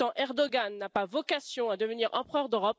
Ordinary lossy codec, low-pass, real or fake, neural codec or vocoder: none; none; real; none